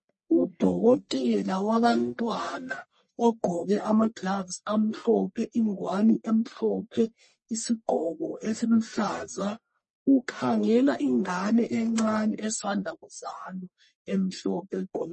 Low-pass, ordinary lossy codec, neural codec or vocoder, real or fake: 10.8 kHz; MP3, 32 kbps; codec, 44.1 kHz, 1.7 kbps, Pupu-Codec; fake